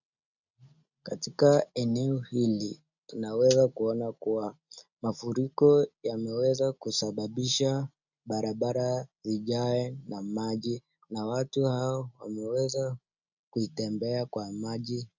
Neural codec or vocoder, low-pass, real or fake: none; 7.2 kHz; real